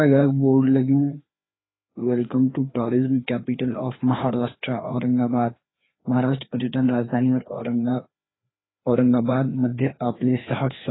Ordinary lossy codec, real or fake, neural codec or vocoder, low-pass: AAC, 16 kbps; fake; codec, 16 kHz, 2 kbps, FreqCodec, larger model; 7.2 kHz